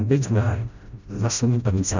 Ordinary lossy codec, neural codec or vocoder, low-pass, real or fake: none; codec, 16 kHz, 0.5 kbps, FreqCodec, smaller model; 7.2 kHz; fake